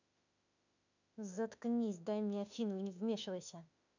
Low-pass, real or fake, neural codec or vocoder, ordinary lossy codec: 7.2 kHz; fake; autoencoder, 48 kHz, 32 numbers a frame, DAC-VAE, trained on Japanese speech; none